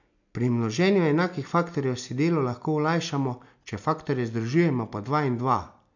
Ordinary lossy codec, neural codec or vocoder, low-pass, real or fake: none; none; 7.2 kHz; real